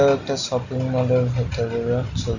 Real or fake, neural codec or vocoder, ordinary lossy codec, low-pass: real; none; none; 7.2 kHz